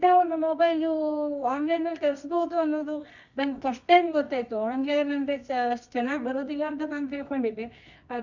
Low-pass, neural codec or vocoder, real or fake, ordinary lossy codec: 7.2 kHz; codec, 24 kHz, 0.9 kbps, WavTokenizer, medium music audio release; fake; none